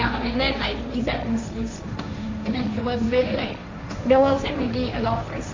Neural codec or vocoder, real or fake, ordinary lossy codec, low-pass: codec, 16 kHz, 1.1 kbps, Voila-Tokenizer; fake; MP3, 64 kbps; 7.2 kHz